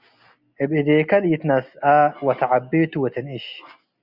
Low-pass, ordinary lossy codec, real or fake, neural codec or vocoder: 5.4 kHz; Opus, 64 kbps; real; none